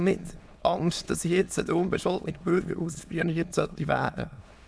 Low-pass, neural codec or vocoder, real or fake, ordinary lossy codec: none; autoencoder, 22.05 kHz, a latent of 192 numbers a frame, VITS, trained on many speakers; fake; none